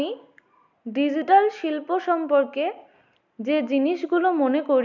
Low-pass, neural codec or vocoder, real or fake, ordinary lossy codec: 7.2 kHz; none; real; none